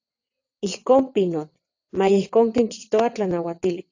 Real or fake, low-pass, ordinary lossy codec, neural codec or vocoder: fake; 7.2 kHz; AAC, 48 kbps; vocoder, 22.05 kHz, 80 mel bands, WaveNeXt